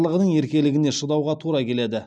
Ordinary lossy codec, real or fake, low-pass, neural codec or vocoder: none; real; none; none